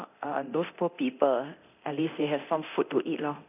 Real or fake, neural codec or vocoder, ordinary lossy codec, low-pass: fake; codec, 24 kHz, 0.9 kbps, DualCodec; none; 3.6 kHz